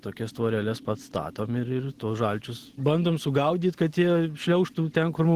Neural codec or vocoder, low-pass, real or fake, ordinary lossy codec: none; 14.4 kHz; real; Opus, 16 kbps